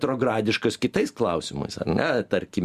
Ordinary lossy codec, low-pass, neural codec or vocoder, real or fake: Opus, 64 kbps; 14.4 kHz; none; real